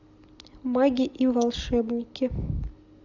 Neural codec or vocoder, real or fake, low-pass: none; real; 7.2 kHz